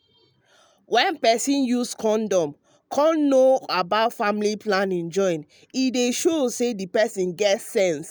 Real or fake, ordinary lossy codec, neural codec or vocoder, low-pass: real; none; none; none